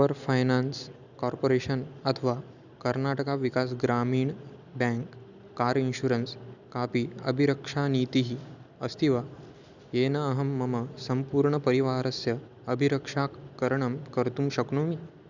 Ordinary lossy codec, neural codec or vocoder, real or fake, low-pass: none; none; real; 7.2 kHz